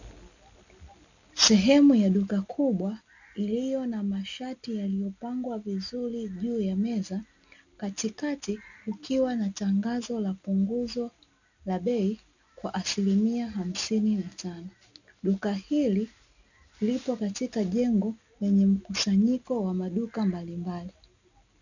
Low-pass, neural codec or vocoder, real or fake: 7.2 kHz; none; real